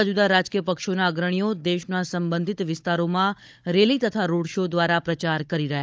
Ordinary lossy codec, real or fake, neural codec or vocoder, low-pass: none; fake; codec, 16 kHz, 16 kbps, FunCodec, trained on Chinese and English, 50 frames a second; none